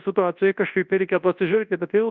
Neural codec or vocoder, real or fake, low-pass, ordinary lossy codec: codec, 24 kHz, 0.9 kbps, WavTokenizer, large speech release; fake; 7.2 kHz; Opus, 64 kbps